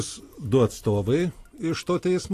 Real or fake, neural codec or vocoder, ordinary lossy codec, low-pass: real; none; AAC, 48 kbps; 14.4 kHz